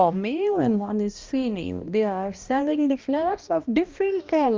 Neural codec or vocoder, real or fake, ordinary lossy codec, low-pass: codec, 16 kHz, 1 kbps, X-Codec, HuBERT features, trained on balanced general audio; fake; Opus, 32 kbps; 7.2 kHz